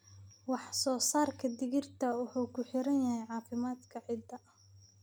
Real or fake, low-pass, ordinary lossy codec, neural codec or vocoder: real; none; none; none